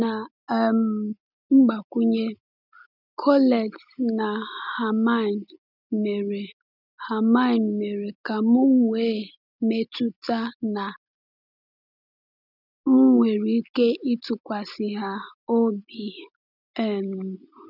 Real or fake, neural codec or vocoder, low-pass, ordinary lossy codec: real; none; 5.4 kHz; none